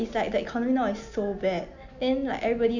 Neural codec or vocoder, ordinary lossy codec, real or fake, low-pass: none; none; real; 7.2 kHz